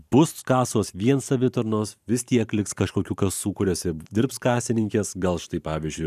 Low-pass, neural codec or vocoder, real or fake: 14.4 kHz; vocoder, 44.1 kHz, 128 mel bands every 512 samples, BigVGAN v2; fake